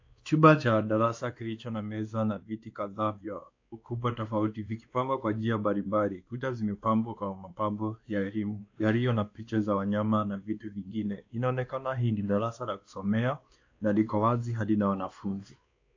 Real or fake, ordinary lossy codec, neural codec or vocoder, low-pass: fake; AAC, 48 kbps; codec, 16 kHz, 2 kbps, X-Codec, WavLM features, trained on Multilingual LibriSpeech; 7.2 kHz